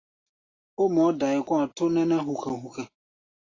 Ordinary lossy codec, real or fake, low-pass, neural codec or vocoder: AAC, 32 kbps; real; 7.2 kHz; none